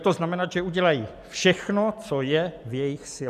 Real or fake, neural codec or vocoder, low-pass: real; none; 14.4 kHz